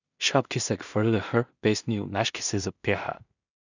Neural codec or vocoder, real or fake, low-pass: codec, 16 kHz in and 24 kHz out, 0.4 kbps, LongCat-Audio-Codec, two codebook decoder; fake; 7.2 kHz